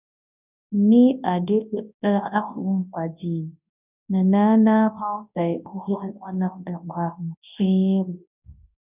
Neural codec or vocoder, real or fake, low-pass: codec, 24 kHz, 0.9 kbps, WavTokenizer, large speech release; fake; 3.6 kHz